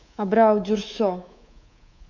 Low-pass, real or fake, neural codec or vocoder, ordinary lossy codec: 7.2 kHz; fake; codec, 24 kHz, 3.1 kbps, DualCodec; none